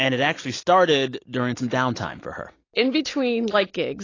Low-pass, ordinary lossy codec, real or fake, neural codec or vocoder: 7.2 kHz; AAC, 32 kbps; real; none